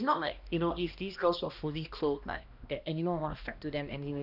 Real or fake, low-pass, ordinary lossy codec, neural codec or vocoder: fake; 5.4 kHz; none; codec, 16 kHz, 1 kbps, X-Codec, HuBERT features, trained on balanced general audio